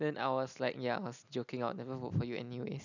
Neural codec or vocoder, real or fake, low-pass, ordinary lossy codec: none; real; 7.2 kHz; none